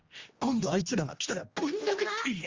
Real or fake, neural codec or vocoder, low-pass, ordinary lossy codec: fake; codec, 16 kHz, 1 kbps, X-Codec, HuBERT features, trained on general audio; 7.2 kHz; Opus, 32 kbps